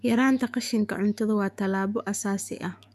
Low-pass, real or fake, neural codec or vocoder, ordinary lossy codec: 14.4 kHz; fake; autoencoder, 48 kHz, 128 numbers a frame, DAC-VAE, trained on Japanese speech; none